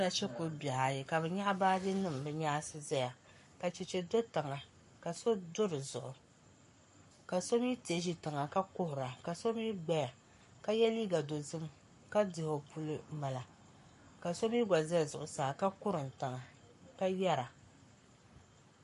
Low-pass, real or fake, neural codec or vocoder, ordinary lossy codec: 14.4 kHz; fake; codec, 44.1 kHz, 7.8 kbps, Pupu-Codec; MP3, 48 kbps